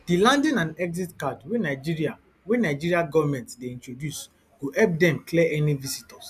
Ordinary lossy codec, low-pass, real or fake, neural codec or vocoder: none; 14.4 kHz; real; none